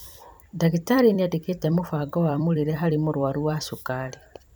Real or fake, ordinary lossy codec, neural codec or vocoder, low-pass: fake; none; vocoder, 44.1 kHz, 128 mel bands every 256 samples, BigVGAN v2; none